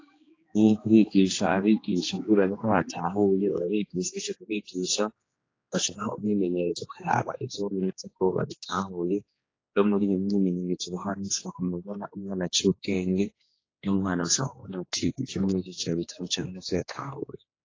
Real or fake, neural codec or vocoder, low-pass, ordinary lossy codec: fake; codec, 16 kHz, 2 kbps, X-Codec, HuBERT features, trained on general audio; 7.2 kHz; AAC, 32 kbps